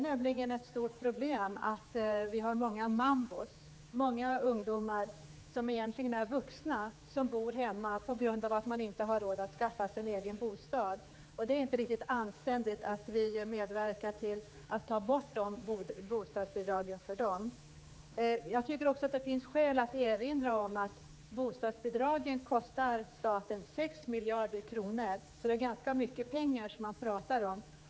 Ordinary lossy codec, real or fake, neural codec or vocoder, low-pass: none; fake; codec, 16 kHz, 4 kbps, X-Codec, HuBERT features, trained on general audio; none